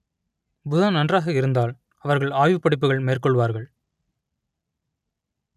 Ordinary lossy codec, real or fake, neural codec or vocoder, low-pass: none; real; none; 14.4 kHz